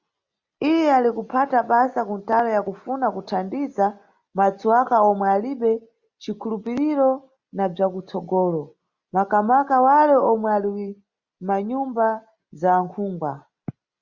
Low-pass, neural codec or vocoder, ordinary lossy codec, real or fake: 7.2 kHz; none; Opus, 64 kbps; real